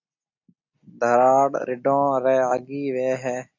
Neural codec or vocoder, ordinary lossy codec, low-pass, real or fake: none; AAC, 48 kbps; 7.2 kHz; real